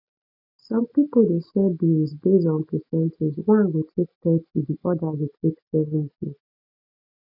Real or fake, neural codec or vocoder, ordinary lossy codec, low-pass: real; none; none; 5.4 kHz